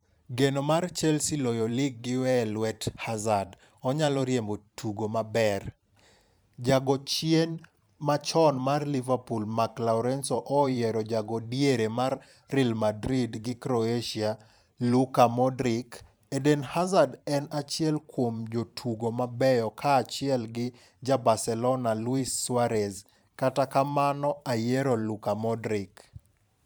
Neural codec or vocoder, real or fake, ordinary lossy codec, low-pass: vocoder, 44.1 kHz, 128 mel bands every 512 samples, BigVGAN v2; fake; none; none